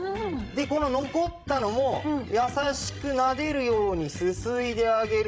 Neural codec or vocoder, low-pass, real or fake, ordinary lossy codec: codec, 16 kHz, 16 kbps, FreqCodec, larger model; none; fake; none